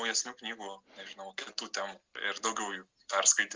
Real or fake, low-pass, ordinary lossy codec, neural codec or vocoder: real; 7.2 kHz; Opus, 32 kbps; none